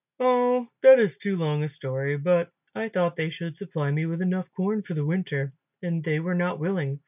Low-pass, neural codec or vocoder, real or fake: 3.6 kHz; none; real